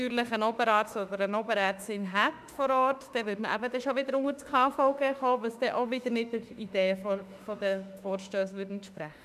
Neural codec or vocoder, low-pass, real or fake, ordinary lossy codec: autoencoder, 48 kHz, 32 numbers a frame, DAC-VAE, trained on Japanese speech; 14.4 kHz; fake; none